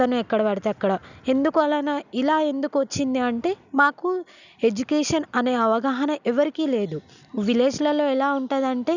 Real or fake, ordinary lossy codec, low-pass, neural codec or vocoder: real; none; 7.2 kHz; none